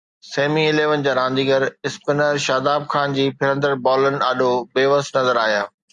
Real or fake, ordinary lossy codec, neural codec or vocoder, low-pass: real; Opus, 64 kbps; none; 10.8 kHz